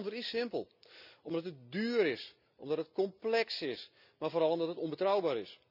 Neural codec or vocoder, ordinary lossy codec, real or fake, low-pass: none; none; real; 5.4 kHz